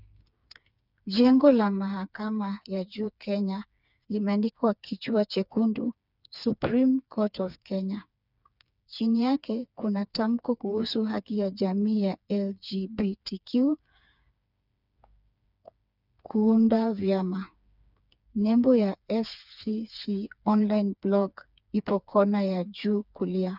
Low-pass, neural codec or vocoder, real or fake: 5.4 kHz; codec, 16 kHz, 4 kbps, FreqCodec, smaller model; fake